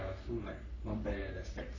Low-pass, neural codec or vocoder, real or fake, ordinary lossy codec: 7.2 kHz; codec, 44.1 kHz, 3.4 kbps, Pupu-Codec; fake; none